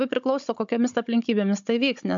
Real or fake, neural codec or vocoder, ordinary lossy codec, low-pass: fake; codec, 16 kHz, 16 kbps, FunCodec, trained on Chinese and English, 50 frames a second; MP3, 64 kbps; 7.2 kHz